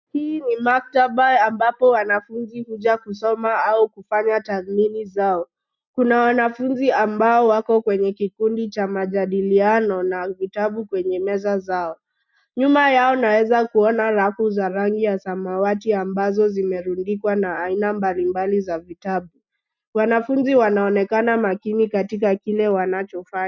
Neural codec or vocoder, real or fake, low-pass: none; real; 7.2 kHz